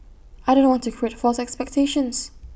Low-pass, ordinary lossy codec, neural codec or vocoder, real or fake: none; none; none; real